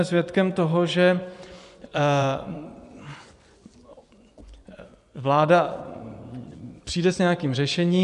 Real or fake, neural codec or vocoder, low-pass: fake; vocoder, 24 kHz, 100 mel bands, Vocos; 10.8 kHz